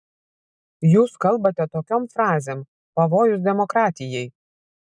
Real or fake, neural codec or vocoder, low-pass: real; none; 9.9 kHz